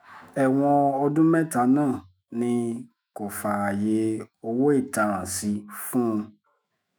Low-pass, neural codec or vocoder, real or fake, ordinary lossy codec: none; autoencoder, 48 kHz, 128 numbers a frame, DAC-VAE, trained on Japanese speech; fake; none